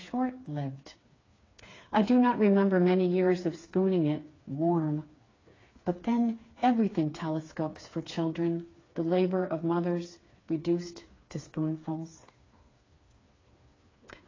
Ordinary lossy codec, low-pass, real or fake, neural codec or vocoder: AAC, 32 kbps; 7.2 kHz; fake; codec, 16 kHz, 4 kbps, FreqCodec, smaller model